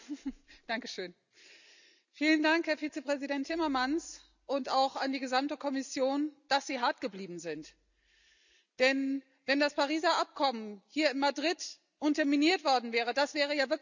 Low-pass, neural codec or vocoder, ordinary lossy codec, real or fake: 7.2 kHz; none; none; real